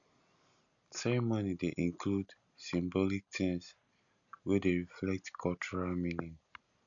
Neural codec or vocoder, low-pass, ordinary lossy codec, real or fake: none; 7.2 kHz; none; real